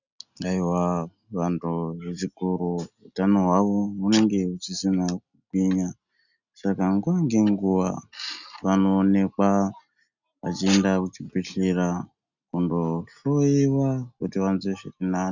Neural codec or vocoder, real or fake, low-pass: none; real; 7.2 kHz